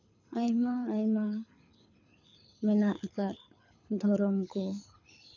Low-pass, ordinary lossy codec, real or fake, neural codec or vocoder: 7.2 kHz; none; fake; codec, 24 kHz, 6 kbps, HILCodec